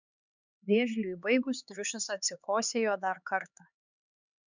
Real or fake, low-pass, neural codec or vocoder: fake; 7.2 kHz; codec, 16 kHz, 4 kbps, X-Codec, HuBERT features, trained on LibriSpeech